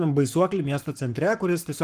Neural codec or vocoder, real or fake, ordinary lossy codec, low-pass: codec, 44.1 kHz, 7.8 kbps, Pupu-Codec; fake; Opus, 24 kbps; 14.4 kHz